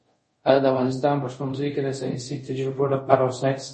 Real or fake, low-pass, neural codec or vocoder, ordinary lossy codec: fake; 10.8 kHz; codec, 24 kHz, 0.5 kbps, DualCodec; MP3, 32 kbps